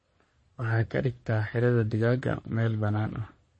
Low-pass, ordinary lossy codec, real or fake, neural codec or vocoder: 9.9 kHz; MP3, 32 kbps; fake; codec, 44.1 kHz, 3.4 kbps, Pupu-Codec